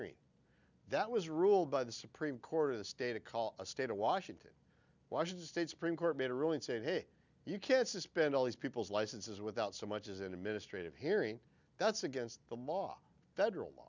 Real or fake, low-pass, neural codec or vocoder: real; 7.2 kHz; none